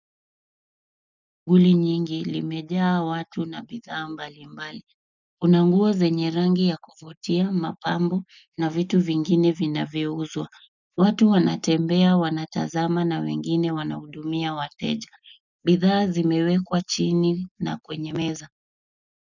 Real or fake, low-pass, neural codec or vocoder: real; 7.2 kHz; none